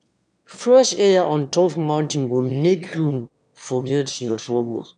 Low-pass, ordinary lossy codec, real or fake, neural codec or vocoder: 9.9 kHz; none; fake; autoencoder, 22.05 kHz, a latent of 192 numbers a frame, VITS, trained on one speaker